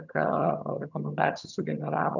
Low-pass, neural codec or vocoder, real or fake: 7.2 kHz; vocoder, 22.05 kHz, 80 mel bands, HiFi-GAN; fake